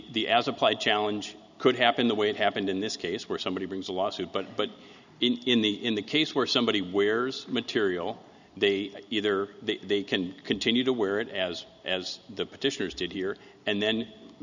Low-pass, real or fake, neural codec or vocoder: 7.2 kHz; real; none